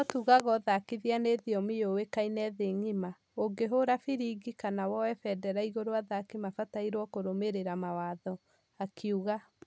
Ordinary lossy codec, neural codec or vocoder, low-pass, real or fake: none; none; none; real